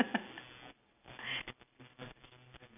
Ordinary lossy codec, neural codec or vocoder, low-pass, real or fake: none; none; 3.6 kHz; real